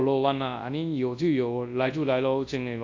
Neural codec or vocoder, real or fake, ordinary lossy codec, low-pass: codec, 24 kHz, 0.9 kbps, WavTokenizer, large speech release; fake; AAC, 48 kbps; 7.2 kHz